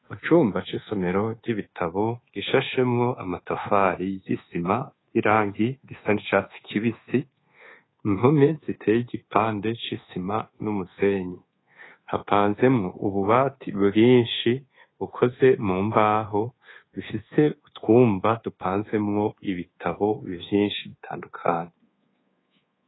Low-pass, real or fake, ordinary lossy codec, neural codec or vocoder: 7.2 kHz; fake; AAC, 16 kbps; codec, 24 kHz, 1.2 kbps, DualCodec